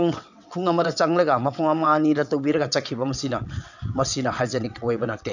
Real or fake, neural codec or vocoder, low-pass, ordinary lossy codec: fake; vocoder, 22.05 kHz, 80 mel bands, WaveNeXt; 7.2 kHz; none